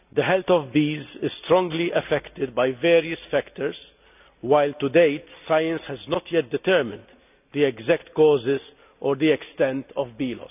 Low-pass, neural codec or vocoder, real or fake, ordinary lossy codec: 3.6 kHz; none; real; none